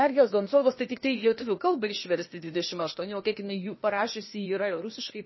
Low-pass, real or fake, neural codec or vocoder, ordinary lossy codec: 7.2 kHz; fake; codec, 16 kHz, 0.8 kbps, ZipCodec; MP3, 24 kbps